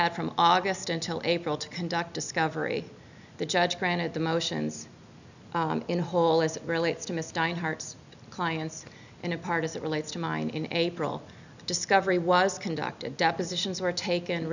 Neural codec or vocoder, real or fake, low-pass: none; real; 7.2 kHz